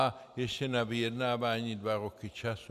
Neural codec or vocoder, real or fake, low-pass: vocoder, 44.1 kHz, 128 mel bands every 256 samples, BigVGAN v2; fake; 14.4 kHz